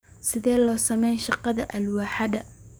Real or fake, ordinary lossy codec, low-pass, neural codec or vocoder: fake; none; none; codec, 44.1 kHz, 7.8 kbps, DAC